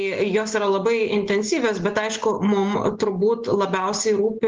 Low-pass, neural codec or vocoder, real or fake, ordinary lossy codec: 7.2 kHz; none; real; Opus, 16 kbps